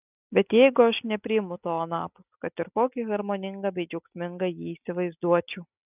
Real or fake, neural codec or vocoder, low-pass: real; none; 3.6 kHz